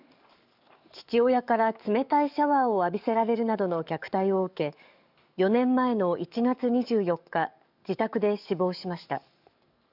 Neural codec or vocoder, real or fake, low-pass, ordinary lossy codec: codec, 44.1 kHz, 7.8 kbps, DAC; fake; 5.4 kHz; none